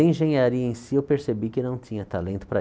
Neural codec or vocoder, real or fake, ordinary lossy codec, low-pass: none; real; none; none